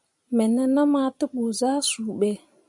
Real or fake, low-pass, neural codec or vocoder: fake; 10.8 kHz; vocoder, 44.1 kHz, 128 mel bands every 256 samples, BigVGAN v2